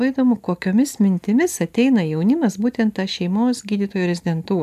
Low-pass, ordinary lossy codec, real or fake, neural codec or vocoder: 14.4 kHz; AAC, 96 kbps; real; none